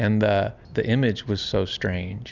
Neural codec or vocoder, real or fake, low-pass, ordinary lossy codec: none; real; 7.2 kHz; Opus, 64 kbps